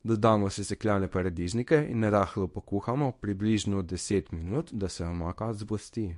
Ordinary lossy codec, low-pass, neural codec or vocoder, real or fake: MP3, 48 kbps; 10.8 kHz; codec, 24 kHz, 0.9 kbps, WavTokenizer, small release; fake